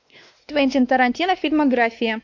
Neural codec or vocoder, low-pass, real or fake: codec, 16 kHz, 2 kbps, X-Codec, WavLM features, trained on Multilingual LibriSpeech; 7.2 kHz; fake